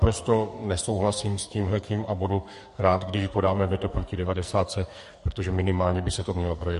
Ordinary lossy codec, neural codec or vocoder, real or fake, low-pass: MP3, 48 kbps; codec, 44.1 kHz, 2.6 kbps, SNAC; fake; 14.4 kHz